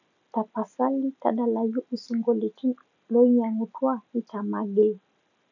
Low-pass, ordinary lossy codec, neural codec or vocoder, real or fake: 7.2 kHz; none; none; real